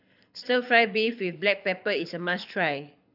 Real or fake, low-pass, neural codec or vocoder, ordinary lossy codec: fake; 5.4 kHz; codec, 24 kHz, 6 kbps, HILCodec; AAC, 48 kbps